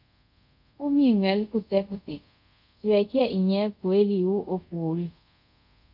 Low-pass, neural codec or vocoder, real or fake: 5.4 kHz; codec, 24 kHz, 0.5 kbps, DualCodec; fake